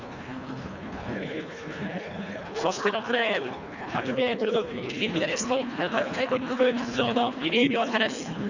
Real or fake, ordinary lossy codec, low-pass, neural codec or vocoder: fake; none; 7.2 kHz; codec, 24 kHz, 1.5 kbps, HILCodec